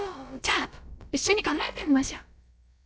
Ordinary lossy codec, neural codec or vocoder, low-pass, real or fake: none; codec, 16 kHz, about 1 kbps, DyCAST, with the encoder's durations; none; fake